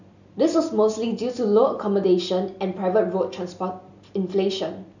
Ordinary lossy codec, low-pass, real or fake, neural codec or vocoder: none; 7.2 kHz; real; none